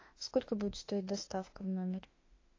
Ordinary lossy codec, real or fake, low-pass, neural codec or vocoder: AAC, 32 kbps; fake; 7.2 kHz; autoencoder, 48 kHz, 32 numbers a frame, DAC-VAE, trained on Japanese speech